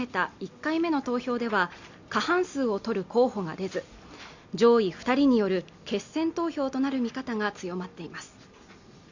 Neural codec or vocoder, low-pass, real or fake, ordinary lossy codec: none; 7.2 kHz; real; Opus, 64 kbps